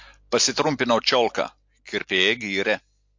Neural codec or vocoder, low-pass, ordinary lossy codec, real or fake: none; 7.2 kHz; MP3, 48 kbps; real